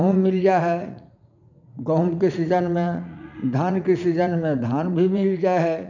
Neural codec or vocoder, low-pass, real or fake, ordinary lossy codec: vocoder, 44.1 kHz, 80 mel bands, Vocos; 7.2 kHz; fake; none